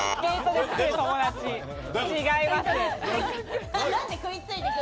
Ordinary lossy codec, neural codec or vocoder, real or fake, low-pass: none; none; real; none